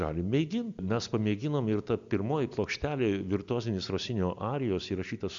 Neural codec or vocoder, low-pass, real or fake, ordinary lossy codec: none; 7.2 kHz; real; MP3, 64 kbps